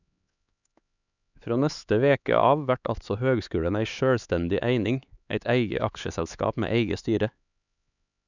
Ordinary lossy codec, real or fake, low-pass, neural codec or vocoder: none; fake; 7.2 kHz; codec, 16 kHz, 4 kbps, X-Codec, HuBERT features, trained on LibriSpeech